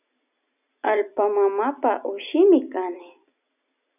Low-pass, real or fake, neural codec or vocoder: 3.6 kHz; real; none